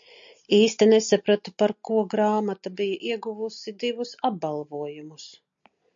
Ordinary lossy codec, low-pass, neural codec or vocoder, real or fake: MP3, 48 kbps; 7.2 kHz; none; real